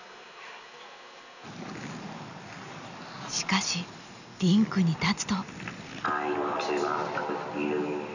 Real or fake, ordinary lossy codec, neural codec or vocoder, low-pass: real; none; none; 7.2 kHz